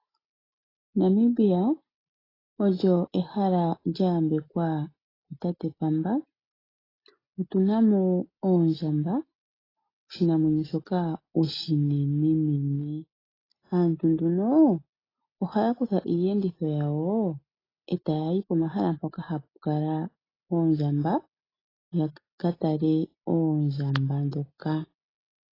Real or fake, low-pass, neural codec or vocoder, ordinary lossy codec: real; 5.4 kHz; none; AAC, 24 kbps